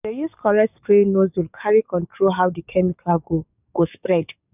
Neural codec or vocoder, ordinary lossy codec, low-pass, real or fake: codec, 44.1 kHz, 7.8 kbps, DAC; none; 3.6 kHz; fake